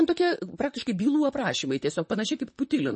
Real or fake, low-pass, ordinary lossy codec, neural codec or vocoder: fake; 10.8 kHz; MP3, 32 kbps; vocoder, 44.1 kHz, 128 mel bands, Pupu-Vocoder